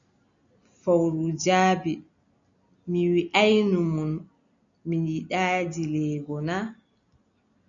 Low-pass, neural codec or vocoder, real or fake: 7.2 kHz; none; real